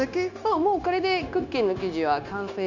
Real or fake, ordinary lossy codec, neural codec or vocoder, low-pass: fake; none; codec, 16 kHz, 0.9 kbps, LongCat-Audio-Codec; 7.2 kHz